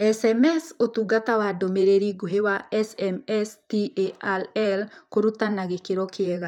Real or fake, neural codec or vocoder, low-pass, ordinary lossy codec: fake; vocoder, 44.1 kHz, 128 mel bands, Pupu-Vocoder; 19.8 kHz; none